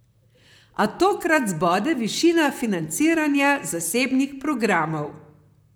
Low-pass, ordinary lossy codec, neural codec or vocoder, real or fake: none; none; vocoder, 44.1 kHz, 128 mel bands, Pupu-Vocoder; fake